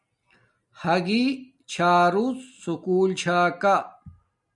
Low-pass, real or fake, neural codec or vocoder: 10.8 kHz; real; none